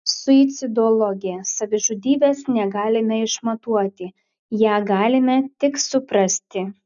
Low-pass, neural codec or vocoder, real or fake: 7.2 kHz; none; real